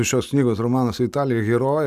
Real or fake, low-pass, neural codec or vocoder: real; 14.4 kHz; none